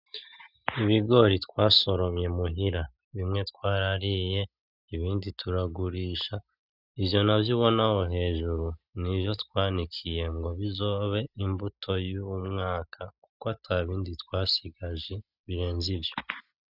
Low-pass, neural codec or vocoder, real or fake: 5.4 kHz; none; real